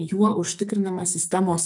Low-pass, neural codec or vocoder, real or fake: 10.8 kHz; codec, 44.1 kHz, 2.6 kbps, SNAC; fake